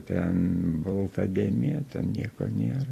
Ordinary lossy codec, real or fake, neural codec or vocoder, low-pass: AAC, 48 kbps; fake; vocoder, 44.1 kHz, 128 mel bands every 256 samples, BigVGAN v2; 14.4 kHz